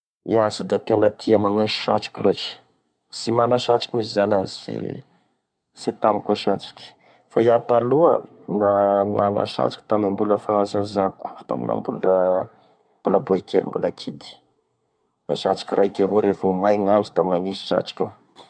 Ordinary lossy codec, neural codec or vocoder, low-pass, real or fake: none; codec, 24 kHz, 1 kbps, SNAC; 9.9 kHz; fake